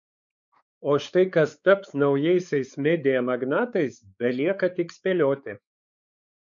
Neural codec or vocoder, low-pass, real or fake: codec, 16 kHz, 4 kbps, X-Codec, WavLM features, trained on Multilingual LibriSpeech; 7.2 kHz; fake